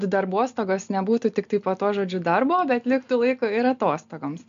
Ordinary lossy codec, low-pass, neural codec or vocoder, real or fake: MP3, 64 kbps; 7.2 kHz; none; real